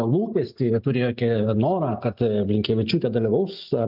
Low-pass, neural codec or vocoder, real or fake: 5.4 kHz; none; real